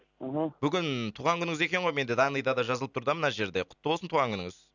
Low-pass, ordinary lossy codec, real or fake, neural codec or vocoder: 7.2 kHz; none; real; none